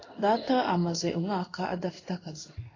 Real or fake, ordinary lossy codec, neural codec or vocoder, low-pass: fake; AAC, 32 kbps; codec, 16 kHz in and 24 kHz out, 1 kbps, XY-Tokenizer; 7.2 kHz